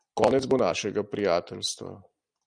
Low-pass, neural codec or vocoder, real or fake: 9.9 kHz; none; real